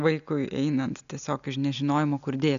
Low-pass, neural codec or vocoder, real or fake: 7.2 kHz; none; real